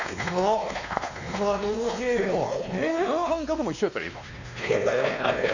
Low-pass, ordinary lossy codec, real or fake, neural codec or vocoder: 7.2 kHz; none; fake; codec, 16 kHz, 2 kbps, X-Codec, WavLM features, trained on Multilingual LibriSpeech